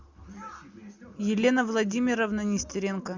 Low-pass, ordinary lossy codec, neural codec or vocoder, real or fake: 7.2 kHz; Opus, 64 kbps; none; real